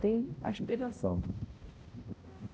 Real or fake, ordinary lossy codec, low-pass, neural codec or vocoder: fake; none; none; codec, 16 kHz, 0.5 kbps, X-Codec, HuBERT features, trained on balanced general audio